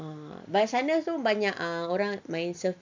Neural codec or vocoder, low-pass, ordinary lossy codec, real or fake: none; 7.2 kHz; MP3, 64 kbps; real